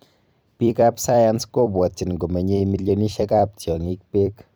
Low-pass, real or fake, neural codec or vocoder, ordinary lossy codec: none; fake; vocoder, 44.1 kHz, 128 mel bands every 256 samples, BigVGAN v2; none